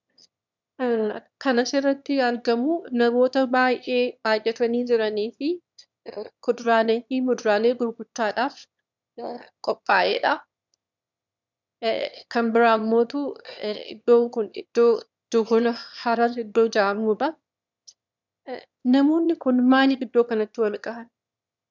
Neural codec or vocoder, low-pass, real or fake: autoencoder, 22.05 kHz, a latent of 192 numbers a frame, VITS, trained on one speaker; 7.2 kHz; fake